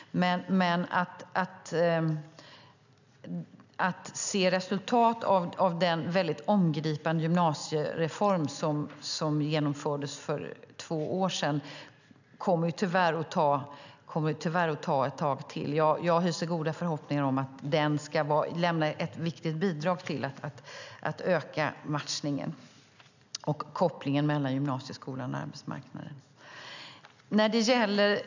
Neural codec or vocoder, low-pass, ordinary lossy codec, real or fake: none; 7.2 kHz; none; real